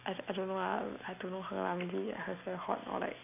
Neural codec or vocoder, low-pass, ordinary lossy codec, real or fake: none; 3.6 kHz; none; real